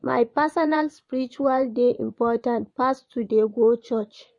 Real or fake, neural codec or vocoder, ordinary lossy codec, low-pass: fake; vocoder, 24 kHz, 100 mel bands, Vocos; MP3, 48 kbps; 10.8 kHz